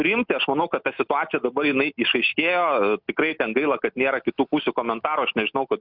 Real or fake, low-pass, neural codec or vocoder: real; 5.4 kHz; none